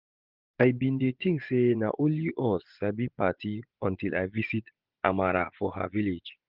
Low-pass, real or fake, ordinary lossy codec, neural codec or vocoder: 5.4 kHz; fake; Opus, 32 kbps; vocoder, 24 kHz, 100 mel bands, Vocos